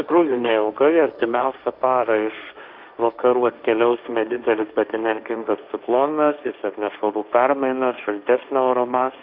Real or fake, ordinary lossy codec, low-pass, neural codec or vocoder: fake; Opus, 64 kbps; 5.4 kHz; codec, 16 kHz, 1.1 kbps, Voila-Tokenizer